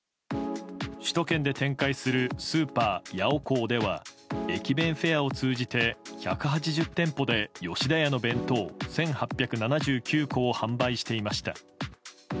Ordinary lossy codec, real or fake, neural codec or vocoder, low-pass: none; real; none; none